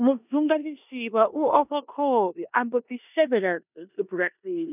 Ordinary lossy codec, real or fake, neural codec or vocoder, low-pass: none; fake; codec, 16 kHz in and 24 kHz out, 0.9 kbps, LongCat-Audio-Codec, four codebook decoder; 3.6 kHz